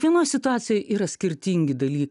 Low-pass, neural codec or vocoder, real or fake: 10.8 kHz; none; real